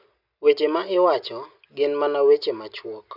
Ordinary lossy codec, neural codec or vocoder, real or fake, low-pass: none; none; real; 5.4 kHz